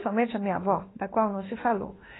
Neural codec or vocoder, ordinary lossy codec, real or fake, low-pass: autoencoder, 48 kHz, 32 numbers a frame, DAC-VAE, trained on Japanese speech; AAC, 16 kbps; fake; 7.2 kHz